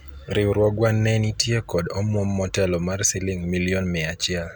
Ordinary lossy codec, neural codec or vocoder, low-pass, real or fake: none; none; none; real